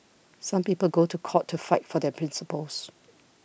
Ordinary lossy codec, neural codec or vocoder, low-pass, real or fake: none; none; none; real